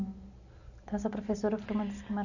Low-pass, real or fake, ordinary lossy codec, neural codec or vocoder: 7.2 kHz; real; none; none